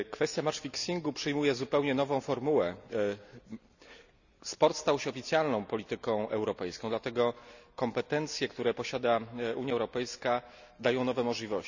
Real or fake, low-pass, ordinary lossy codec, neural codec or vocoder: real; 7.2 kHz; none; none